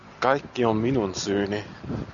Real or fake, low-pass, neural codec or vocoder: real; 7.2 kHz; none